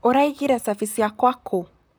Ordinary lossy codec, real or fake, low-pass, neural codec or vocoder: none; fake; none; vocoder, 44.1 kHz, 128 mel bands, Pupu-Vocoder